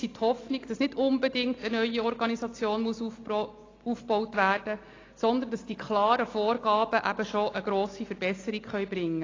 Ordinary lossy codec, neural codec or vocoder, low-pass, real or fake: AAC, 32 kbps; none; 7.2 kHz; real